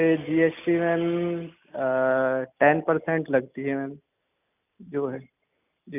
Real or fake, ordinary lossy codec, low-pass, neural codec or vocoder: real; none; 3.6 kHz; none